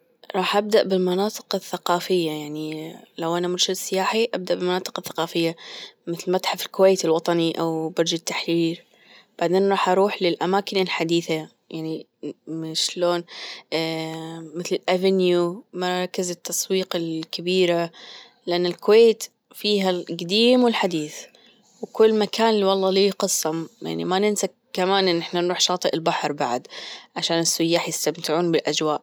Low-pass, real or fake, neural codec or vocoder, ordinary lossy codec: none; real; none; none